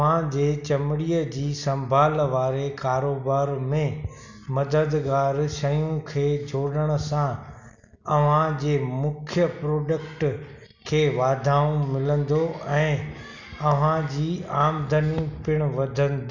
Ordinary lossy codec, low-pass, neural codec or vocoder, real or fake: none; 7.2 kHz; none; real